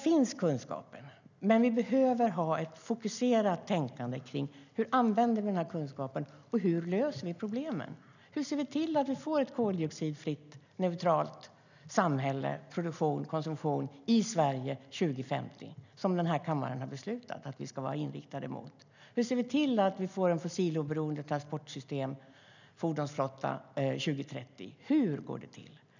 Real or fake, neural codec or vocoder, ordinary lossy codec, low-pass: real; none; none; 7.2 kHz